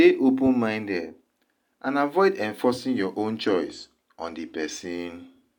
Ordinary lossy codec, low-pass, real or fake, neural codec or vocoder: none; 19.8 kHz; real; none